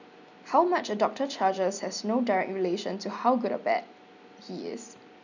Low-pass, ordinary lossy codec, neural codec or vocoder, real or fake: 7.2 kHz; none; none; real